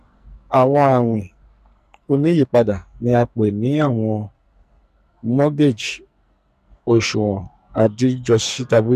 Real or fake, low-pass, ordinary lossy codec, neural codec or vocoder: fake; 14.4 kHz; none; codec, 44.1 kHz, 2.6 kbps, SNAC